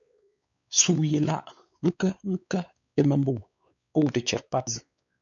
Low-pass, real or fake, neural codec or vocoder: 7.2 kHz; fake; codec, 16 kHz, 4 kbps, X-Codec, WavLM features, trained on Multilingual LibriSpeech